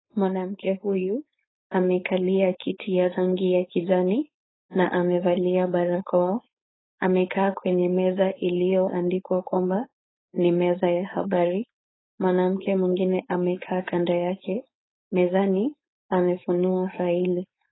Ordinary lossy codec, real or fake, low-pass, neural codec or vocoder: AAC, 16 kbps; fake; 7.2 kHz; codec, 16 kHz, 4.8 kbps, FACodec